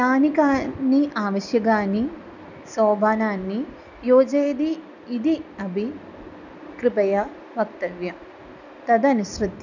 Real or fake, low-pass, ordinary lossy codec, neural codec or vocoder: real; 7.2 kHz; none; none